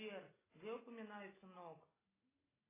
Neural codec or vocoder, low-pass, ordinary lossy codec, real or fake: none; 3.6 kHz; AAC, 16 kbps; real